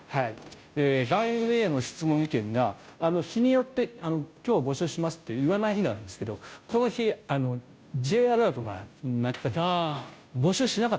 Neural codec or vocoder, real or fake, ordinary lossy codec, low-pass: codec, 16 kHz, 0.5 kbps, FunCodec, trained on Chinese and English, 25 frames a second; fake; none; none